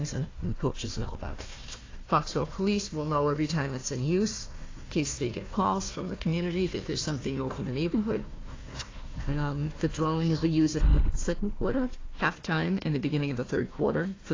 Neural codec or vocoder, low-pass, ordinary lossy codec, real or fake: codec, 16 kHz, 1 kbps, FunCodec, trained on Chinese and English, 50 frames a second; 7.2 kHz; AAC, 32 kbps; fake